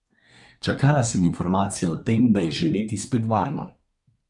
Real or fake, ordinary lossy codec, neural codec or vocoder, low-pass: fake; none; codec, 24 kHz, 1 kbps, SNAC; 10.8 kHz